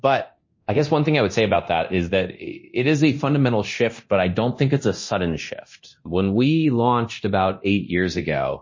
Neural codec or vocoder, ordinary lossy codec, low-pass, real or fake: codec, 24 kHz, 0.9 kbps, DualCodec; MP3, 32 kbps; 7.2 kHz; fake